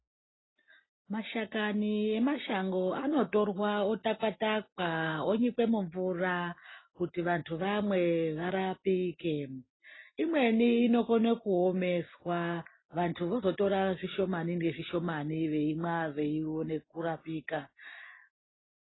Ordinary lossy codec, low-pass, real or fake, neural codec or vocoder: AAC, 16 kbps; 7.2 kHz; real; none